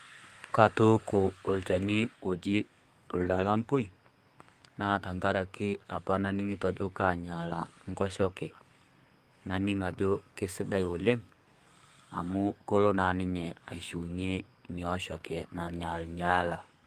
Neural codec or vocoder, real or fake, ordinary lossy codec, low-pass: codec, 32 kHz, 1.9 kbps, SNAC; fake; Opus, 32 kbps; 14.4 kHz